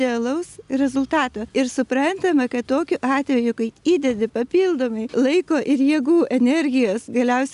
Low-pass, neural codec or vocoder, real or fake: 10.8 kHz; none; real